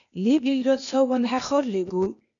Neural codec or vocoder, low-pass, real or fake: codec, 16 kHz, 0.8 kbps, ZipCodec; 7.2 kHz; fake